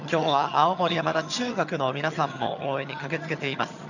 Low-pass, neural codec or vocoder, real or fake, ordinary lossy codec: 7.2 kHz; vocoder, 22.05 kHz, 80 mel bands, HiFi-GAN; fake; none